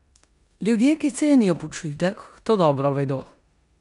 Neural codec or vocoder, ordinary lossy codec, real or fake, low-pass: codec, 16 kHz in and 24 kHz out, 0.9 kbps, LongCat-Audio-Codec, four codebook decoder; none; fake; 10.8 kHz